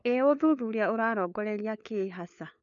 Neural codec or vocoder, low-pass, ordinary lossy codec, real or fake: codec, 16 kHz, 4 kbps, FunCodec, trained on LibriTTS, 50 frames a second; 7.2 kHz; none; fake